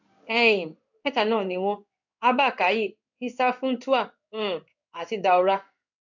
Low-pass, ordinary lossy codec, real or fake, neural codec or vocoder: 7.2 kHz; none; fake; codec, 16 kHz in and 24 kHz out, 1 kbps, XY-Tokenizer